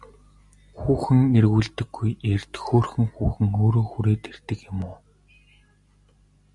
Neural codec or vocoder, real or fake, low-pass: none; real; 10.8 kHz